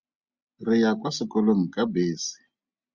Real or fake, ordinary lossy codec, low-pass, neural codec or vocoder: real; Opus, 64 kbps; 7.2 kHz; none